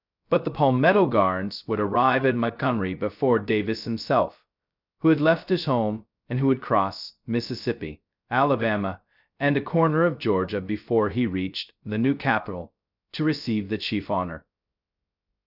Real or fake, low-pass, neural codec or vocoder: fake; 5.4 kHz; codec, 16 kHz, 0.2 kbps, FocalCodec